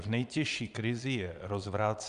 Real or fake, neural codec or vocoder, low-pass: fake; vocoder, 22.05 kHz, 80 mel bands, Vocos; 9.9 kHz